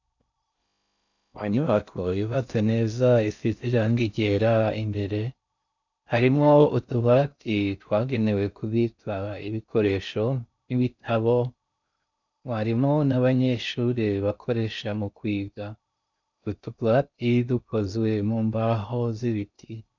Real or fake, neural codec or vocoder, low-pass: fake; codec, 16 kHz in and 24 kHz out, 0.6 kbps, FocalCodec, streaming, 4096 codes; 7.2 kHz